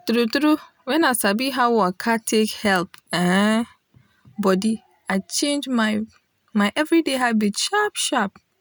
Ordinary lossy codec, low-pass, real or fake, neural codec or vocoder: none; none; real; none